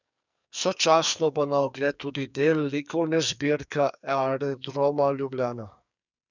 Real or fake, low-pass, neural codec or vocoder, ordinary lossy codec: fake; 7.2 kHz; codec, 44.1 kHz, 2.6 kbps, SNAC; none